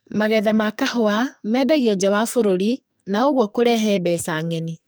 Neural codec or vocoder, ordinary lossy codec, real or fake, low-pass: codec, 44.1 kHz, 2.6 kbps, SNAC; none; fake; none